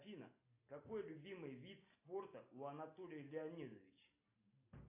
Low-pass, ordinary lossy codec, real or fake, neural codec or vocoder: 3.6 kHz; AAC, 24 kbps; real; none